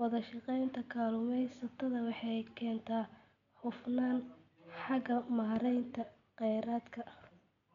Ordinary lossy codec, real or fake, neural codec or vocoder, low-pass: none; real; none; 7.2 kHz